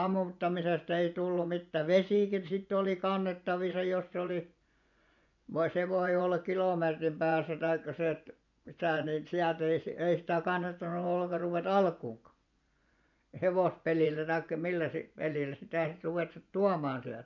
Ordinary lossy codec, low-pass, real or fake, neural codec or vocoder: none; 7.2 kHz; fake; vocoder, 24 kHz, 100 mel bands, Vocos